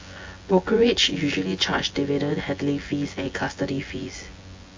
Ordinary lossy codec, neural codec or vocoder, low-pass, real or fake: MP3, 48 kbps; vocoder, 24 kHz, 100 mel bands, Vocos; 7.2 kHz; fake